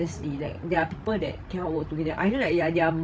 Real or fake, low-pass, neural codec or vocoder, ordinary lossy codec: fake; none; codec, 16 kHz, 16 kbps, FreqCodec, larger model; none